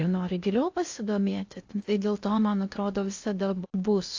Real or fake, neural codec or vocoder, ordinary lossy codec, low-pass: fake; codec, 16 kHz in and 24 kHz out, 0.6 kbps, FocalCodec, streaming, 2048 codes; AAC, 48 kbps; 7.2 kHz